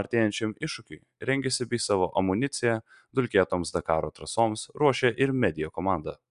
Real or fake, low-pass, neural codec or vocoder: real; 10.8 kHz; none